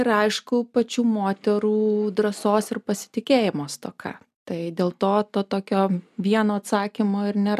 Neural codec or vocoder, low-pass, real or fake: none; 14.4 kHz; real